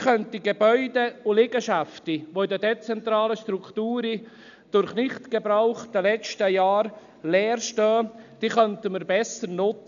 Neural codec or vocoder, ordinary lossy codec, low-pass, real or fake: none; AAC, 96 kbps; 7.2 kHz; real